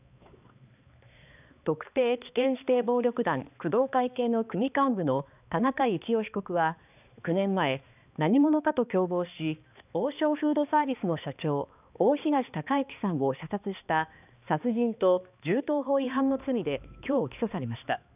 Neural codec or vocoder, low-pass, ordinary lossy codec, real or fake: codec, 16 kHz, 2 kbps, X-Codec, HuBERT features, trained on balanced general audio; 3.6 kHz; none; fake